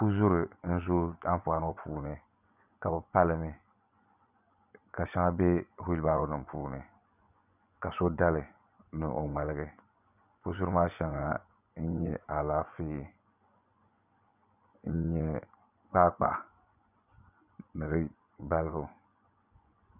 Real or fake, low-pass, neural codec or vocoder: fake; 3.6 kHz; vocoder, 24 kHz, 100 mel bands, Vocos